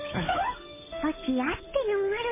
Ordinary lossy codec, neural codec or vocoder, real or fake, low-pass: MP3, 16 kbps; codec, 16 kHz, 8 kbps, FunCodec, trained on Chinese and English, 25 frames a second; fake; 3.6 kHz